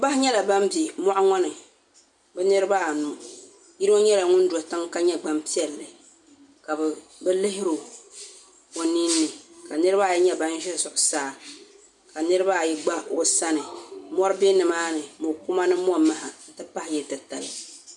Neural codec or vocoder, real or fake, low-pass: none; real; 10.8 kHz